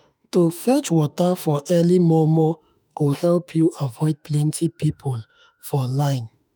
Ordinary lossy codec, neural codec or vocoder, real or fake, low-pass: none; autoencoder, 48 kHz, 32 numbers a frame, DAC-VAE, trained on Japanese speech; fake; none